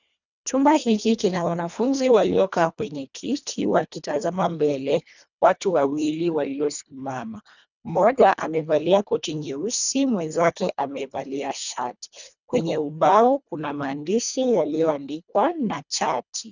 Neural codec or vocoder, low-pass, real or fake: codec, 24 kHz, 1.5 kbps, HILCodec; 7.2 kHz; fake